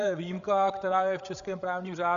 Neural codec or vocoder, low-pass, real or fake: codec, 16 kHz, 8 kbps, FreqCodec, larger model; 7.2 kHz; fake